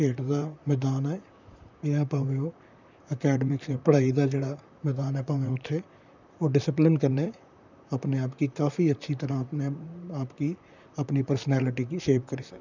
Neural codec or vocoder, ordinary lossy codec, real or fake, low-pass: vocoder, 44.1 kHz, 128 mel bands, Pupu-Vocoder; none; fake; 7.2 kHz